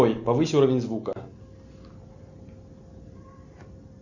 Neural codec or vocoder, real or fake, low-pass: none; real; 7.2 kHz